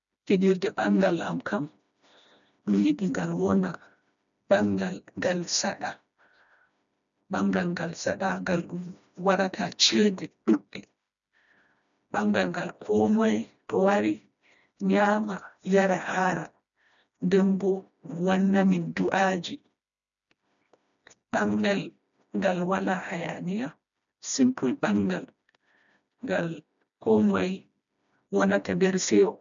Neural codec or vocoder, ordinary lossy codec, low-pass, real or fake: codec, 16 kHz, 1 kbps, FreqCodec, smaller model; none; 7.2 kHz; fake